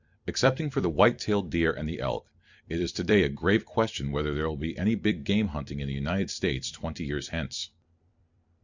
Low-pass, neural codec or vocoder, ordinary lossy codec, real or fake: 7.2 kHz; vocoder, 44.1 kHz, 128 mel bands every 256 samples, BigVGAN v2; Opus, 64 kbps; fake